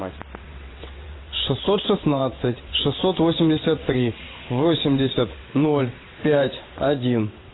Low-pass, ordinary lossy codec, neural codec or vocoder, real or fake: 7.2 kHz; AAC, 16 kbps; vocoder, 22.05 kHz, 80 mel bands, WaveNeXt; fake